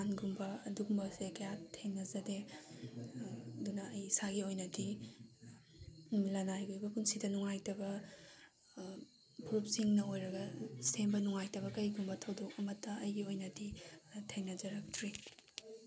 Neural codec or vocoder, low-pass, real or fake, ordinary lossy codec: none; none; real; none